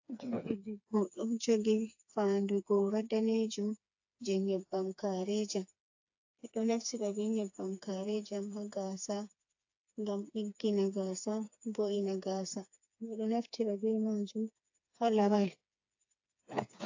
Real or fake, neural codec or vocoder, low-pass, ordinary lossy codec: fake; codec, 16 kHz, 4 kbps, FreqCodec, smaller model; 7.2 kHz; AAC, 48 kbps